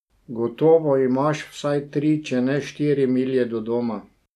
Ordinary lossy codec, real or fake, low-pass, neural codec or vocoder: none; real; 14.4 kHz; none